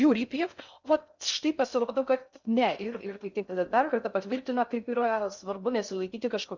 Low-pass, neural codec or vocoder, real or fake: 7.2 kHz; codec, 16 kHz in and 24 kHz out, 0.6 kbps, FocalCodec, streaming, 2048 codes; fake